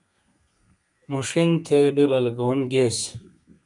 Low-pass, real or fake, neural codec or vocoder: 10.8 kHz; fake; codec, 32 kHz, 1.9 kbps, SNAC